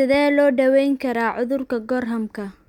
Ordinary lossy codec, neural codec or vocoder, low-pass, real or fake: none; none; 19.8 kHz; real